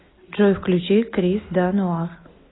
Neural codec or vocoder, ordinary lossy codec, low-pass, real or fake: none; AAC, 16 kbps; 7.2 kHz; real